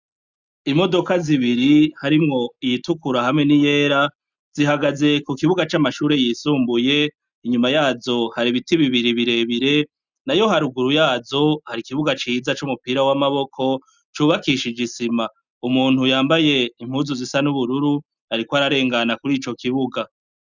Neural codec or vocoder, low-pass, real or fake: none; 7.2 kHz; real